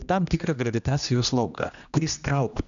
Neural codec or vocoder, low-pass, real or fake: codec, 16 kHz, 1 kbps, X-Codec, HuBERT features, trained on general audio; 7.2 kHz; fake